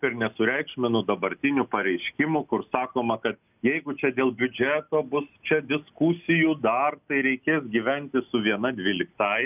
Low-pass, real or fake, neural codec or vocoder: 3.6 kHz; real; none